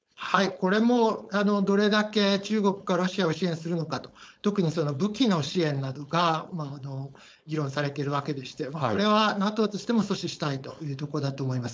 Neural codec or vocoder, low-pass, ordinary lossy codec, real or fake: codec, 16 kHz, 4.8 kbps, FACodec; none; none; fake